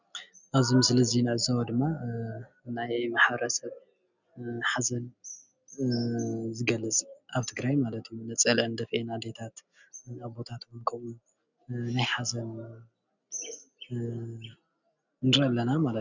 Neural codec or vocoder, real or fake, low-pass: none; real; 7.2 kHz